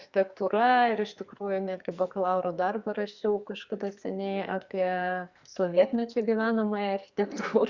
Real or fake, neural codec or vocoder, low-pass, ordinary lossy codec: fake; codec, 44.1 kHz, 2.6 kbps, SNAC; 7.2 kHz; Opus, 64 kbps